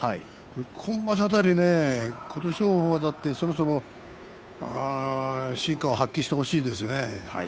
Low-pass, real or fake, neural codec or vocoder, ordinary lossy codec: none; fake; codec, 16 kHz, 2 kbps, FunCodec, trained on Chinese and English, 25 frames a second; none